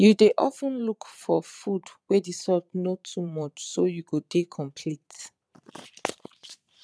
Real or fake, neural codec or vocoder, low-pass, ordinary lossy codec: fake; vocoder, 22.05 kHz, 80 mel bands, Vocos; none; none